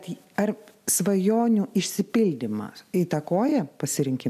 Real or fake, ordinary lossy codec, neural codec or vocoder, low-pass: real; AAC, 96 kbps; none; 14.4 kHz